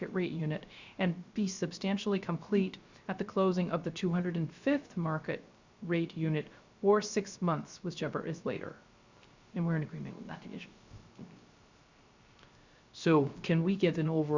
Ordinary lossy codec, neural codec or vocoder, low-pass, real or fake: Opus, 64 kbps; codec, 16 kHz, 0.3 kbps, FocalCodec; 7.2 kHz; fake